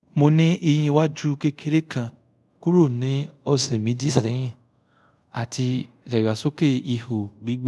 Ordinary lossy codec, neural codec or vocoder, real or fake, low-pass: none; codec, 24 kHz, 0.5 kbps, DualCodec; fake; none